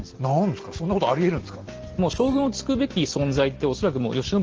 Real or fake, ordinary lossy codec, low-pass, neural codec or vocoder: real; Opus, 16 kbps; 7.2 kHz; none